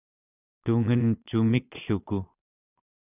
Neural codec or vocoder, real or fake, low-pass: vocoder, 22.05 kHz, 80 mel bands, Vocos; fake; 3.6 kHz